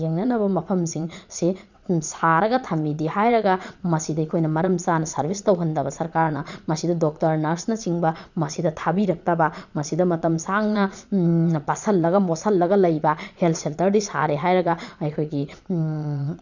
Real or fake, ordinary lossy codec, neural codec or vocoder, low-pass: fake; none; vocoder, 22.05 kHz, 80 mel bands, Vocos; 7.2 kHz